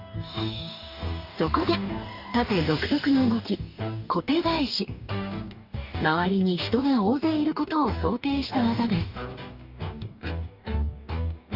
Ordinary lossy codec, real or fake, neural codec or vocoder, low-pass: none; fake; codec, 44.1 kHz, 2.6 kbps, DAC; 5.4 kHz